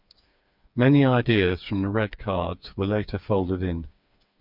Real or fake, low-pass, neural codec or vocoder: fake; 5.4 kHz; codec, 16 kHz, 4 kbps, FreqCodec, smaller model